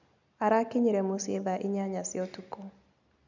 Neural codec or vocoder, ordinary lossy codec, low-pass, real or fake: none; none; 7.2 kHz; real